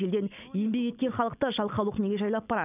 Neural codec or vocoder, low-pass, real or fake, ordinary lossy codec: none; 3.6 kHz; real; none